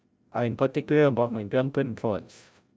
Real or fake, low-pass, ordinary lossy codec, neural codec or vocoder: fake; none; none; codec, 16 kHz, 0.5 kbps, FreqCodec, larger model